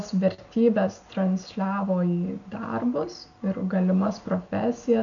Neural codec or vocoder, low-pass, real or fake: none; 7.2 kHz; real